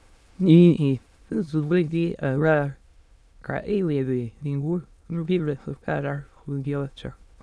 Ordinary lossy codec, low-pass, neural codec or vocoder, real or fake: none; none; autoencoder, 22.05 kHz, a latent of 192 numbers a frame, VITS, trained on many speakers; fake